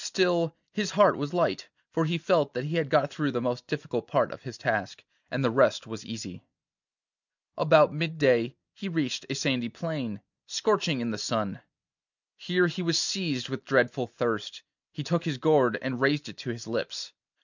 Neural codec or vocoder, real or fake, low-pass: none; real; 7.2 kHz